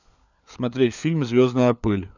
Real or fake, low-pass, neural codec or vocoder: fake; 7.2 kHz; codec, 16 kHz, 4 kbps, FunCodec, trained on LibriTTS, 50 frames a second